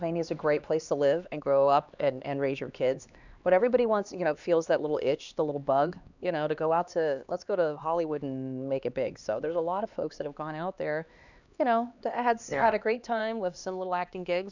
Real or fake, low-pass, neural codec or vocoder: fake; 7.2 kHz; codec, 16 kHz, 2 kbps, X-Codec, HuBERT features, trained on LibriSpeech